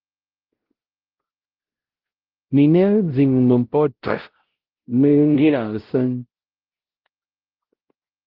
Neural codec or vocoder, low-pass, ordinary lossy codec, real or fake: codec, 16 kHz, 0.5 kbps, X-Codec, WavLM features, trained on Multilingual LibriSpeech; 5.4 kHz; Opus, 16 kbps; fake